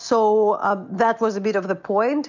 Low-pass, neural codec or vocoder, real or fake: 7.2 kHz; none; real